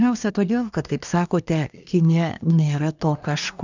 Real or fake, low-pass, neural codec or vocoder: fake; 7.2 kHz; codec, 24 kHz, 1 kbps, SNAC